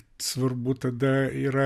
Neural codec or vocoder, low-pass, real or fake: none; 14.4 kHz; real